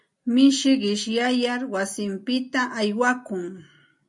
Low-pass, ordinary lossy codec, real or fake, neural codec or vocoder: 10.8 kHz; MP3, 48 kbps; real; none